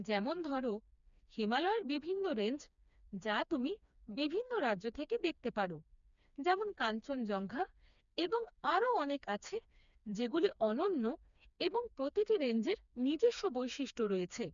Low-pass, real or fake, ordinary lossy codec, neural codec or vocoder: 7.2 kHz; fake; MP3, 96 kbps; codec, 16 kHz, 2 kbps, FreqCodec, smaller model